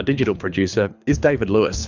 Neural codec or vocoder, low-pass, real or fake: codec, 24 kHz, 6 kbps, HILCodec; 7.2 kHz; fake